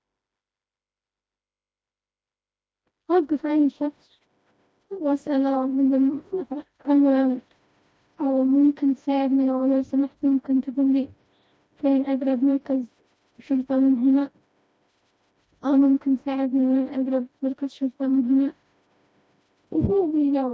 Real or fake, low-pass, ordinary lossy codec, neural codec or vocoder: fake; none; none; codec, 16 kHz, 1 kbps, FreqCodec, smaller model